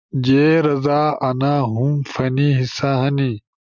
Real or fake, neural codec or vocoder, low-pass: real; none; 7.2 kHz